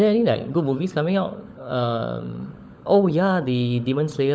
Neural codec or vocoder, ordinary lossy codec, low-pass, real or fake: codec, 16 kHz, 16 kbps, FunCodec, trained on LibriTTS, 50 frames a second; none; none; fake